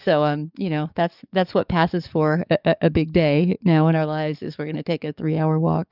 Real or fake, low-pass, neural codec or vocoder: fake; 5.4 kHz; codec, 44.1 kHz, 7.8 kbps, DAC